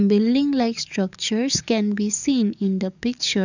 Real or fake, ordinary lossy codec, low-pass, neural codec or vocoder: fake; none; 7.2 kHz; codec, 44.1 kHz, 7.8 kbps, DAC